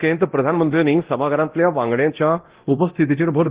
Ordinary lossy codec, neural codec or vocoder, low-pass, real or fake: Opus, 16 kbps; codec, 24 kHz, 0.9 kbps, DualCodec; 3.6 kHz; fake